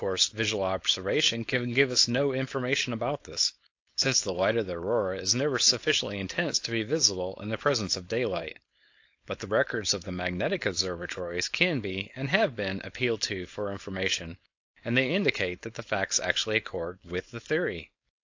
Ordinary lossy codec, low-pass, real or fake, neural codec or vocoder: AAC, 48 kbps; 7.2 kHz; fake; codec, 16 kHz, 4.8 kbps, FACodec